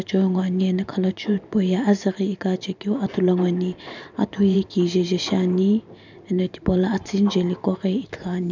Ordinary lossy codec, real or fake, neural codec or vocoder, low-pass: none; fake; vocoder, 44.1 kHz, 128 mel bands every 512 samples, BigVGAN v2; 7.2 kHz